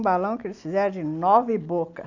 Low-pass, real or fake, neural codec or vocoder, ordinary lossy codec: 7.2 kHz; real; none; none